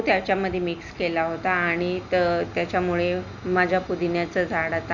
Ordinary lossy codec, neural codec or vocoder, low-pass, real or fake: none; none; 7.2 kHz; real